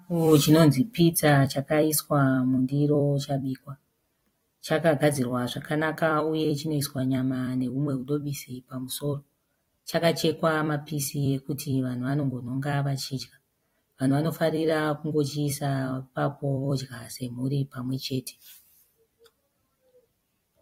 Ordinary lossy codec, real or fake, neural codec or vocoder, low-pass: AAC, 48 kbps; fake; vocoder, 44.1 kHz, 128 mel bands every 256 samples, BigVGAN v2; 19.8 kHz